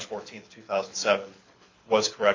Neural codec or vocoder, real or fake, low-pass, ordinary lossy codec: codec, 24 kHz, 6 kbps, HILCodec; fake; 7.2 kHz; MP3, 48 kbps